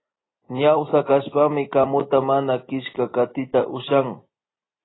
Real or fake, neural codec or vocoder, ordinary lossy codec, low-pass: real; none; AAC, 16 kbps; 7.2 kHz